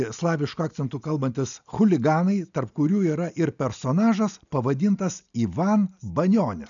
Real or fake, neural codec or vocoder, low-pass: real; none; 7.2 kHz